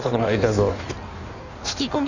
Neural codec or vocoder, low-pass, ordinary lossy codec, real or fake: codec, 16 kHz in and 24 kHz out, 1.1 kbps, FireRedTTS-2 codec; 7.2 kHz; none; fake